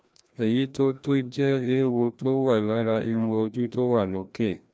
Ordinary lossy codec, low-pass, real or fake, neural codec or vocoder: none; none; fake; codec, 16 kHz, 1 kbps, FreqCodec, larger model